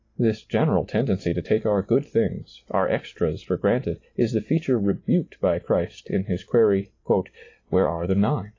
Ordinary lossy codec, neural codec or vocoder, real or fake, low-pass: AAC, 32 kbps; none; real; 7.2 kHz